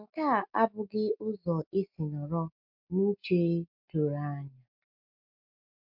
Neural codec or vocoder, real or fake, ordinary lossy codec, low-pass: none; real; MP3, 48 kbps; 5.4 kHz